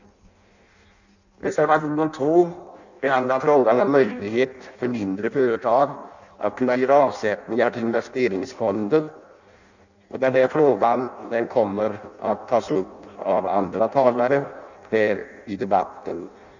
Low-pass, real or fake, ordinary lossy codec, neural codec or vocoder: 7.2 kHz; fake; none; codec, 16 kHz in and 24 kHz out, 0.6 kbps, FireRedTTS-2 codec